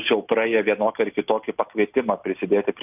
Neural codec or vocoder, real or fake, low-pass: none; real; 3.6 kHz